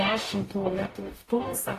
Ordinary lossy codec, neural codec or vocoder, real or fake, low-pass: AAC, 48 kbps; codec, 44.1 kHz, 0.9 kbps, DAC; fake; 14.4 kHz